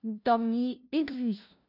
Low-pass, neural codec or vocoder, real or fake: 5.4 kHz; codec, 16 kHz, 0.5 kbps, FunCodec, trained on LibriTTS, 25 frames a second; fake